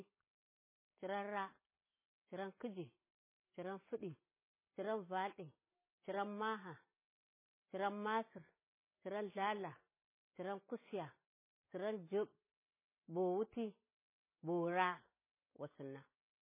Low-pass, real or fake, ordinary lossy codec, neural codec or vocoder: 3.6 kHz; real; MP3, 16 kbps; none